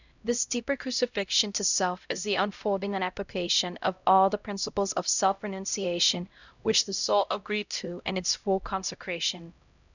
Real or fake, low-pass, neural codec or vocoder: fake; 7.2 kHz; codec, 16 kHz, 0.5 kbps, X-Codec, HuBERT features, trained on LibriSpeech